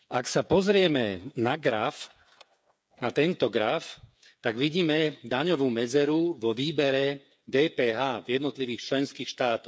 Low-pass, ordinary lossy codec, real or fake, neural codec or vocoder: none; none; fake; codec, 16 kHz, 8 kbps, FreqCodec, smaller model